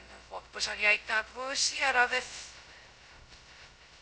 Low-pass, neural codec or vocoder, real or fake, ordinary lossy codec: none; codec, 16 kHz, 0.2 kbps, FocalCodec; fake; none